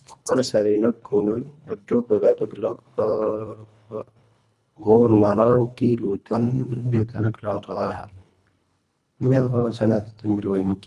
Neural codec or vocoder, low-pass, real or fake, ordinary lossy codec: codec, 24 kHz, 1.5 kbps, HILCodec; none; fake; none